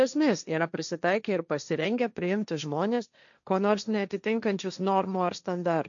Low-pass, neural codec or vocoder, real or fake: 7.2 kHz; codec, 16 kHz, 1.1 kbps, Voila-Tokenizer; fake